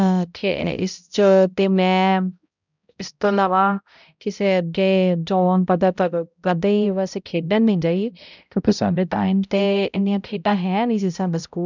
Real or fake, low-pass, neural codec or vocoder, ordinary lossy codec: fake; 7.2 kHz; codec, 16 kHz, 0.5 kbps, X-Codec, HuBERT features, trained on balanced general audio; none